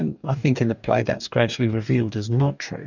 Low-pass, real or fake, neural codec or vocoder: 7.2 kHz; fake; codec, 44.1 kHz, 2.6 kbps, DAC